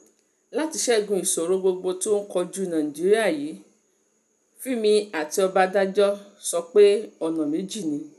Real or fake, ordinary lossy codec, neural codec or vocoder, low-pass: real; none; none; 14.4 kHz